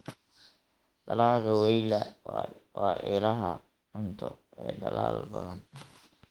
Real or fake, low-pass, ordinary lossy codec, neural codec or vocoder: fake; 19.8 kHz; Opus, 24 kbps; autoencoder, 48 kHz, 32 numbers a frame, DAC-VAE, trained on Japanese speech